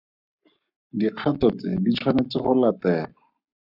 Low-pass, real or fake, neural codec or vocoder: 5.4 kHz; fake; codec, 44.1 kHz, 7.8 kbps, Pupu-Codec